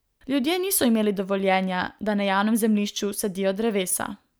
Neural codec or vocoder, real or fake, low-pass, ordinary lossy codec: none; real; none; none